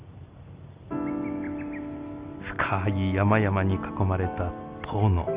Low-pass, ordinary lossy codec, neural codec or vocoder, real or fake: 3.6 kHz; Opus, 24 kbps; none; real